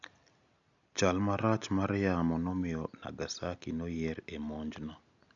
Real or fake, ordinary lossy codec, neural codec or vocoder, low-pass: real; none; none; 7.2 kHz